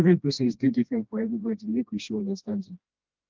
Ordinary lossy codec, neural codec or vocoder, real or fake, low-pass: Opus, 32 kbps; codec, 16 kHz, 1 kbps, FreqCodec, smaller model; fake; 7.2 kHz